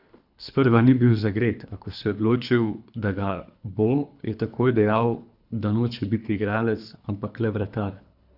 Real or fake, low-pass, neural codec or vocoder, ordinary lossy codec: fake; 5.4 kHz; codec, 24 kHz, 3 kbps, HILCodec; none